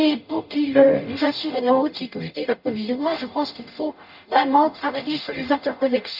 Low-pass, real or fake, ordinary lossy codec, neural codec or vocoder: 5.4 kHz; fake; none; codec, 44.1 kHz, 0.9 kbps, DAC